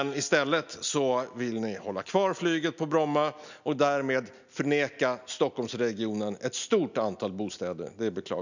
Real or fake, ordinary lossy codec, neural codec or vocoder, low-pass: real; none; none; 7.2 kHz